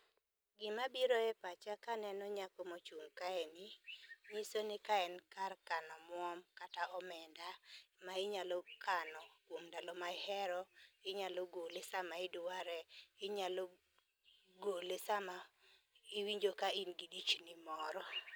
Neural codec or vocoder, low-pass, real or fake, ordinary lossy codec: none; none; real; none